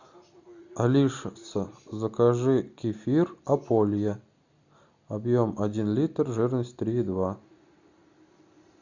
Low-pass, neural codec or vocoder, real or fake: 7.2 kHz; none; real